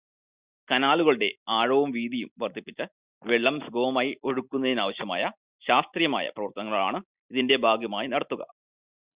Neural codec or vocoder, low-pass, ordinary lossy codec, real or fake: none; 3.6 kHz; Opus, 64 kbps; real